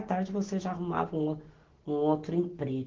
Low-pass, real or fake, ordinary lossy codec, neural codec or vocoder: 7.2 kHz; real; Opus, 16 kbps; none